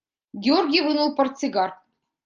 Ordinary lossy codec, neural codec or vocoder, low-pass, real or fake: Opus, 24 kbps; none; 7.2 kHz; real